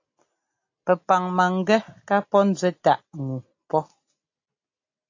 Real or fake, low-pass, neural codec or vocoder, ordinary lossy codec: real; 7.2 kHz; none; AAC, 48 kbps